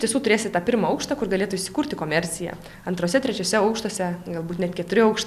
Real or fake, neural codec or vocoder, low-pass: real; none; 14.4 kHz